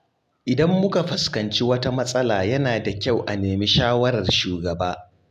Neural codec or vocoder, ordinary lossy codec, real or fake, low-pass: none; none; real; 14.4 kHz